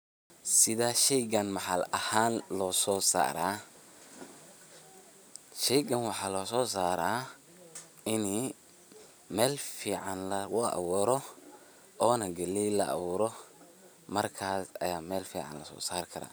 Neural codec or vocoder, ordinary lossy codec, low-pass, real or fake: none; none; none; real